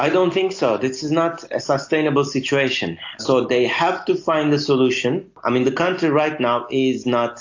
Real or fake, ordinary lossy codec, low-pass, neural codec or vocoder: real; AAC, 48 kbps; 7.2 kHz; none